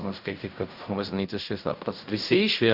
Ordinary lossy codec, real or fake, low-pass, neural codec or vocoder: Opus, 64 kbps; fake; 5.4 kHz; codec, 16 kHz in and 24 kHz out, 0.4 kbps, LongCat-Audio-Codec, fine tuned four codebook decoder